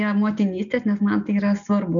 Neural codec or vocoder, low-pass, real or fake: none; 7.2 kHz; real